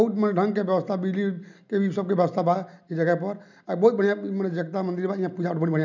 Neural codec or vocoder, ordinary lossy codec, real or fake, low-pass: none; none; real; 7.2 kHz